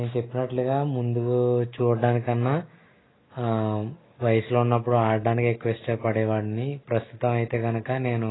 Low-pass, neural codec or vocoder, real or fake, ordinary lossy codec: 7.2 kHz; none; real; AAC, 16 kbps